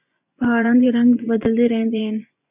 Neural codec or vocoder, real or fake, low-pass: vocoder, 24 kHz, 100 mel bands, Vocos; fake; 3.6 kHz